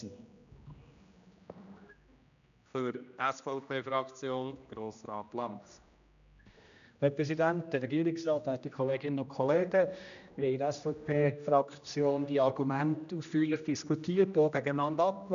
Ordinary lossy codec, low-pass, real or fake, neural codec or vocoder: none; 7.2 kHz; fake; codec, 16 kHz, 1 kbps, X-Codec, HuBERT features, trained on general audio